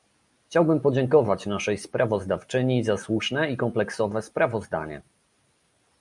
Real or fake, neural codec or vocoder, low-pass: real; none; 10.8 kHz